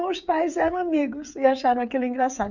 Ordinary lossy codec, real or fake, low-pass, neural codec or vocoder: none; fake; 7.2 kHz; codec, 16 kHz, 8 kbps, FreqCodec, smaller model